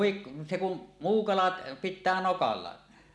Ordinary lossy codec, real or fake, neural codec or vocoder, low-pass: none; real; none; none